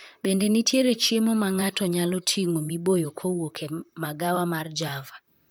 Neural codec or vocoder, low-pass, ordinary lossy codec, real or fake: vocoder, 44.1 kHz, 128 mel bands, Pupu-Vocoder; none; none; fake